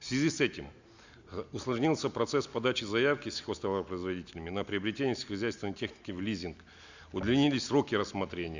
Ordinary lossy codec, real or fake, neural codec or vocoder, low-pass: Opus, 64 kbps; real; none; 7.2 kHz